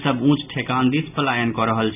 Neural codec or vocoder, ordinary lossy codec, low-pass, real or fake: none; none; 3.6 kHz; real